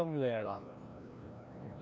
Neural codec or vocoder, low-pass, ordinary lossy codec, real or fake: codec, 16 kHz, 1 kbps, FreqCodec, larger model; none; none; fake